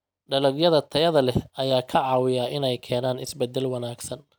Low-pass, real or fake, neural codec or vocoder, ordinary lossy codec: none; real; none; none